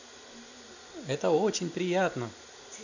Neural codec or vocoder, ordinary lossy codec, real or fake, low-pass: none; none; real; 7.2 kHz